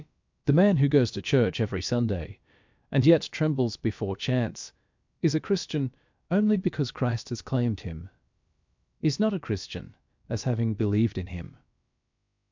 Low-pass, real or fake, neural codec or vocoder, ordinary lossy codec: 7.2 kHz; fake; codec, 16 kHz, about 1 kbps, DyCAST, with the encoder's durations; MP3, 64 kbps